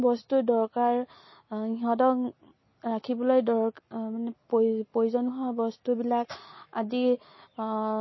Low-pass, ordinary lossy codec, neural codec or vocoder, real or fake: 7.2 kHz; MP3, 24 kbps; none; real